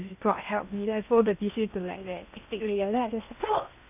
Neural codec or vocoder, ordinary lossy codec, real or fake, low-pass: codec, 16 kHz in and 24 kHz out, 0.8 kbps, FocalCodec, streaming, 65536 codes; none; fake; 3.6 kHz